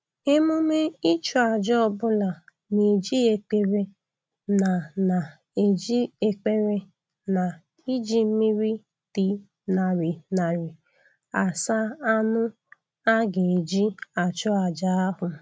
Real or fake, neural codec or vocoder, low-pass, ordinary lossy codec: real; none; none; none